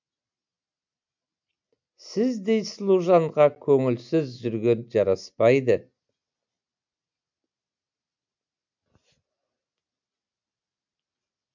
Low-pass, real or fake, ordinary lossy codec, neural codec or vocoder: 7.2 kHz; real; MP3, 64 kbps; none